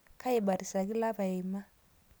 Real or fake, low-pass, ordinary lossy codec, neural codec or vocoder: real; none; none; none